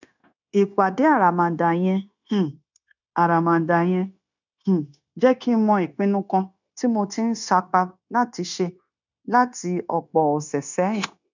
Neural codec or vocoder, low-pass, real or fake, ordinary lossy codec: codec, 16 kHz, 0.9 kbps, LongCat-Audio-Codec; 7.2 kHz; fake; none